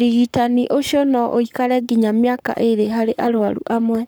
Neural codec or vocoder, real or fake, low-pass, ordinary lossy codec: codec, 44.1 kHz, 7.8 kbps, Pupu-Codec; fake; none; none